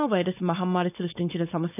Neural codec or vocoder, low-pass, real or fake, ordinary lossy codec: codec, 16 kHz, 4.8 kbps, FACodec; 3.6 kHz; fake; none